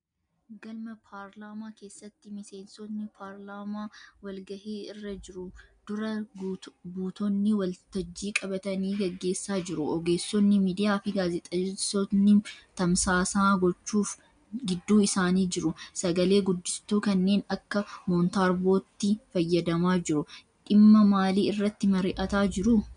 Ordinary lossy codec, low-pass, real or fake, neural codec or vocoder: MP3, 96 kbps; 9.9 kHz; real; none